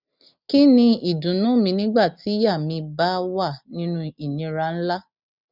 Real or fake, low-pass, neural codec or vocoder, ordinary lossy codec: real; 5.4 kHz; none; none